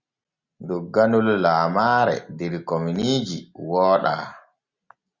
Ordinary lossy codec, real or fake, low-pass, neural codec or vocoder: Opus, 64 kbps; real; 7.2 kHz; none